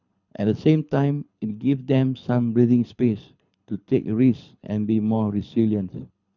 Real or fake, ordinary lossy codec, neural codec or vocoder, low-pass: fake; none; codec, 24 kHz, 6 kbps, HILCodec; 7.2 kHz